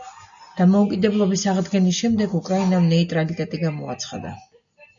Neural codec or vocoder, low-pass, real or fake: none; 7.2 kHz; real